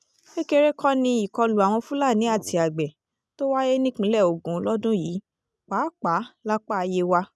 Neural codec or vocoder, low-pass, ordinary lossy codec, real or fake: none; none; none; real